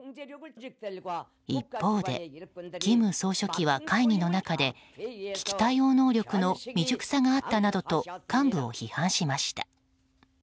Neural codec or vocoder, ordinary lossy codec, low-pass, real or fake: none; none; none; real